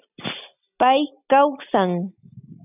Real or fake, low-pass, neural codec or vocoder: real; 3.6 kHz; none